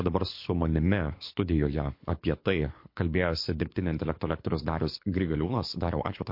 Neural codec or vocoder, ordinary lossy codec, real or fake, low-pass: codec, 24 kHz, 6 kbps, HILCodec; MP3, 32 kbps; fake; 5.4 kHz